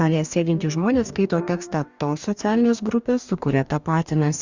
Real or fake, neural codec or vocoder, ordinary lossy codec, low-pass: fake; codec, 44.1 kHz, 2.6 kbps, DAC; Opus, 64 kbps; 7.2 kHz